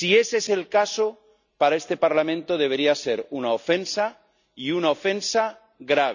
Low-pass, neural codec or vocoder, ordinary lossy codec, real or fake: 7.2 kHz; none; none; real